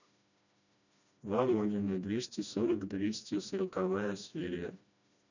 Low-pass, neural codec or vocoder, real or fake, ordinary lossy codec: 7.2 kHz; codec, 16 kHz, 1 kbps, FreqCodec, smaller model; fake; none